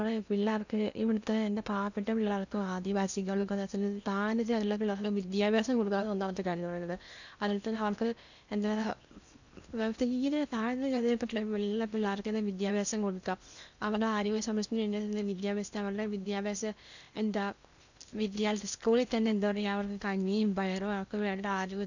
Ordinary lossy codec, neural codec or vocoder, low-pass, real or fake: none; codec, 16 kHz in and 24 kHz out, 0.8 kbps, FocalCodec, streaming, 65536 codes; 7.2 kHz; fake